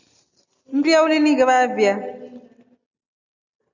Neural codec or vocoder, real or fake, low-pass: none; real; 7.2 kHz